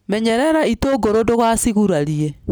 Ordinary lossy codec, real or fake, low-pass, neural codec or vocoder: none; real; none; none